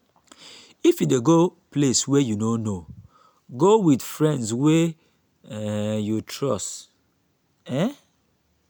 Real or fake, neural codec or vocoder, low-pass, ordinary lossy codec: real; none; none; none